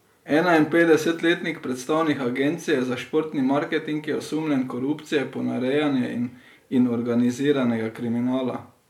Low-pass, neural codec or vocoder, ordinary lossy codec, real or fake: 19.8 kHz; none; MP3, 96 kbps; real